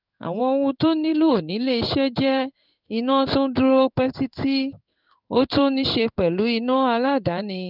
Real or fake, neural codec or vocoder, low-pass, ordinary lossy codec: fake; codec, 16 kHz in and 24 kHz out, 1 kbps, XY-Tokenizer; 5.4 kHz; none